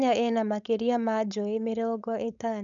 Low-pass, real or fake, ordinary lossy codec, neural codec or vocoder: 7.2 kHz; fake; none; codec, 16 kHz, 4.8 kbps, FACodec